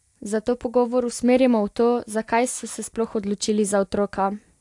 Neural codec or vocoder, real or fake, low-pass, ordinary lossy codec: none; real; 10.8 kHz; AAC, 64 kbps